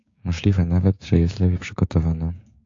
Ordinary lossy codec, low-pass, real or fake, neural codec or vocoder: AAC, 48 kbps; 7.2 kHz; fake; codec, 16 kHz, 6 kbps, DAC